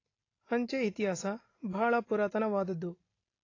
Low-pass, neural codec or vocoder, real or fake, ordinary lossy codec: 7.2 kHz; none; real; AAC, 32 kbps